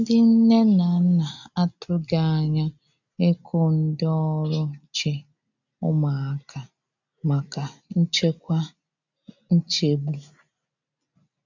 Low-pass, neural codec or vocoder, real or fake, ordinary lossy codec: 7.2 kHz; none; real; none